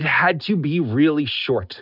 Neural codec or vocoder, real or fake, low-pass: none; real; 5.4 kHz